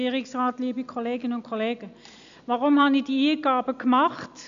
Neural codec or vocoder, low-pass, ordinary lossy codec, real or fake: none; 7.2 kHz; AAC, 96 kbps; real